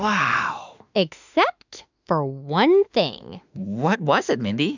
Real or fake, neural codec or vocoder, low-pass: fake; autoencoder, 48 kHz, 32 numbers a frame, DAC-VAE, trained on Japanese speech; 7.2 kHz